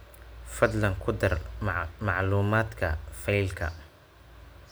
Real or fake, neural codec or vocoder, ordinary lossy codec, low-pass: real; none; none; none